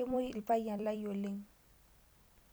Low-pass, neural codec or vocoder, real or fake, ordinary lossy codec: none; vocoder, 44.1 kHz, 128 mel bands every 256 samples, BigVGAN v2; fake; none